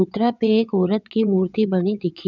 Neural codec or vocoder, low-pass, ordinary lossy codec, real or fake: vocoder, 22.05 kHz, 80 mel bands, WaveNeXt; 7.2 kHz; none; fake